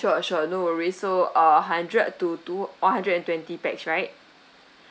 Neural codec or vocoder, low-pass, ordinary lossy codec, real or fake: none; none; none; real